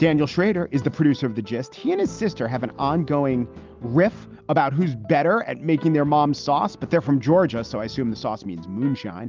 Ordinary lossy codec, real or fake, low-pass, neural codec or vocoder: Opus, 32 kbps; real; 7.2 kHz; none